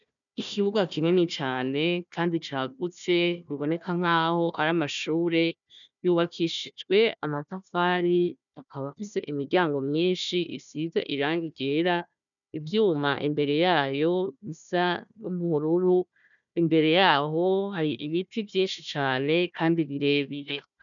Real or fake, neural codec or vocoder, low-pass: fake; codec, 16 kHz, 1 kbps, FunCodec, trained on Chinese and English, 50 frames a second; 7.2 kHz